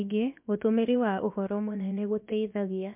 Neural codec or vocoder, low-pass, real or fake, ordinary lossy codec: codec, 16 kHz, about 1 kbps, DyCAST, with the encoder's durations; 3.6 kHz; fake; MP3, 32 kbps